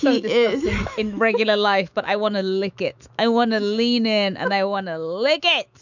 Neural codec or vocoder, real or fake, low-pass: autoencoder, 48 kHz, 128 numbers a frame, DAC-VAE, trained on Japanese speech; fake; 7.2 kHz